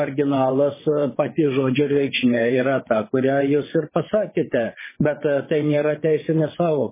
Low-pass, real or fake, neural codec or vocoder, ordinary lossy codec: 3.6 kHz; fake; vocoder, 22.05 kHz, 80 mel bands, WaveNeXt; MP3, 16 kbps